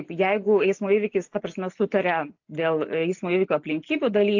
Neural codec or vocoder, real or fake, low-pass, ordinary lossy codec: none; real; 7.2 kHz; AAC, 48 kbps